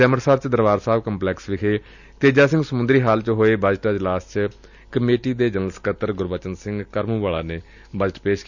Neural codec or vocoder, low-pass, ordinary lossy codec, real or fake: none; 7.2 kHz; none; real